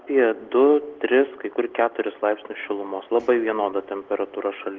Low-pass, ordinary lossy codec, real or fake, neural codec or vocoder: 7.2 kHz; Opus, 16 kbps; real; none